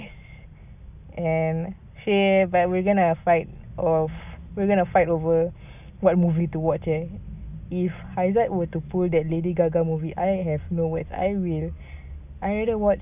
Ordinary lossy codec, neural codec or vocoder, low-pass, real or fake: none; none; 3.6 kHz; real